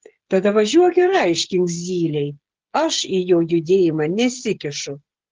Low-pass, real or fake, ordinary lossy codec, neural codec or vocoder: 7.2 kHz; fake; Opus, 16 kbps; codec, 16 kHz, 16 kbps, FreqCodec, smaller model